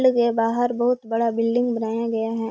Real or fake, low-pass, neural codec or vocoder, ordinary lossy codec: real; none; none; none